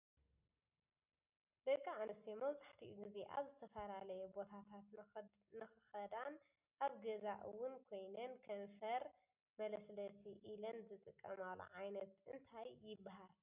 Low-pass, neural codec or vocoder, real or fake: 3.6 kHz; none; real